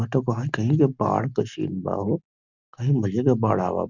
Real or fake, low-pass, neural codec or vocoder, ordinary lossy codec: real; 7.2 kHz; none; none